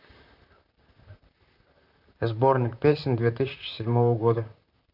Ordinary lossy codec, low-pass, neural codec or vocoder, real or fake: none; 5.4 kHz; vocoder, 44.1 kHz, 128 mel bands, Pupu-Vocoder; fake